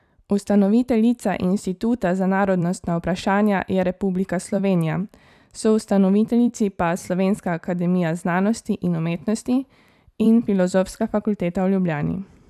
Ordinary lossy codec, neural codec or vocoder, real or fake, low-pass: none; vocoder, 44.1 kHz, 128 mel bands every 256 samples, BigVGAN v2; fake; 14.4 kHz